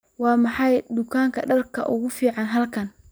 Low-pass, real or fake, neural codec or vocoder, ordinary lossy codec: none; real; none; none